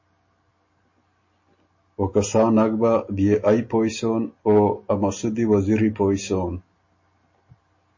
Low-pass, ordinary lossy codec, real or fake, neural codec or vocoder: 7.2 kHz; MP3, 32 kbps; real; none